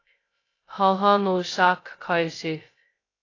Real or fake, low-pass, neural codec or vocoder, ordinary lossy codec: fake; 7.2 kHz; codec, 16 kHz, 0.2 kbps, FocalCodec; AAC, 32 kbps